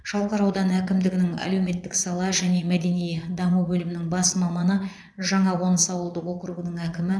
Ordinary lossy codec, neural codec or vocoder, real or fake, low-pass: none; vocoder, 22.05 kHz, 80 mel bands, Vocos; fake; none